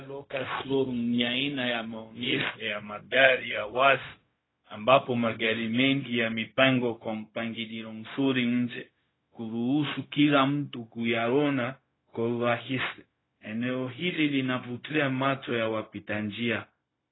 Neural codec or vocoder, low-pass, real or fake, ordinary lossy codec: codec, 16 kHz, 0.4 kbps, LongCat-Audio-Codec; 7.2 kHz; fake; AAC, 16 kbps